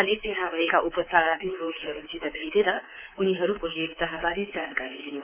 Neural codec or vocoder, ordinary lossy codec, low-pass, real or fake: codec, 24 kHz, 3.1 kbps, DualCodec; none; 3.6 kHz; fake